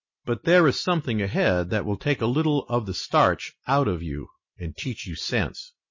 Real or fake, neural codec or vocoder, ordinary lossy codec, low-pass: real; none; MP3, 32 kbps; 7.2 kHz